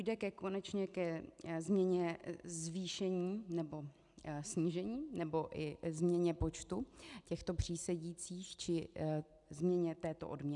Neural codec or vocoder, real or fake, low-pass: none; real; 10.8 kHz